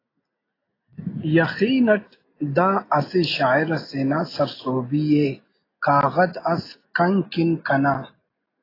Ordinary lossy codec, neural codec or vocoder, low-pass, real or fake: AAC, 24 kbps; none; 5.4 kHz; real